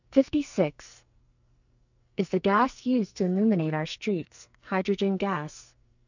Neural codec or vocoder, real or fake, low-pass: codec, 44.1 kHz, 2.6 kbps, SNAC; fake; 7.2 kHz